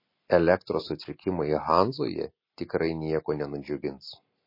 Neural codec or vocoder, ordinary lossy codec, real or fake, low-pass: none; MP3, 24 kbps; real; 5.4 kHz